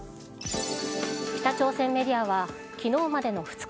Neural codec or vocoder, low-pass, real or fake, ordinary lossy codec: none; none; real; none